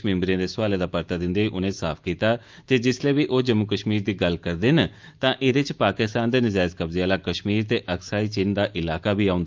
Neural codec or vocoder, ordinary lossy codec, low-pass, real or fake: autoencoder, 48 kHz, 128 numbers a frame, DAC-VAE, trained on Japanese speech; Opus, 32 kbps; 7.2 kHz; fake